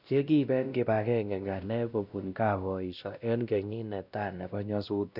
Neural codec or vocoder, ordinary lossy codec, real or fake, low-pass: codec, 16 kHz, 1 kbps, X-Codec, WavLM features, trained on Multilingual LibriSpeech; none; fake; 5.4 kHz